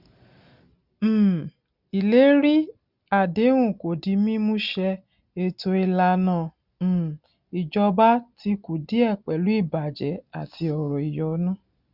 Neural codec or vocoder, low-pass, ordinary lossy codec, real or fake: none; 5.4 kHz; none; real